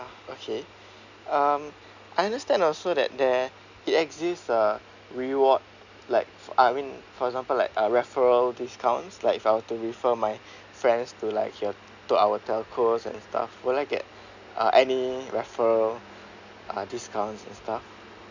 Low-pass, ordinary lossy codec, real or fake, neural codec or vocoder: 7.2 kHz; none; real; none